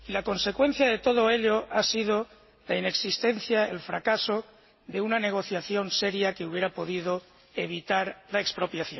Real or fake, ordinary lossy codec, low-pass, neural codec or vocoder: real; MP3, 24 kbps; 7.2 kHz; none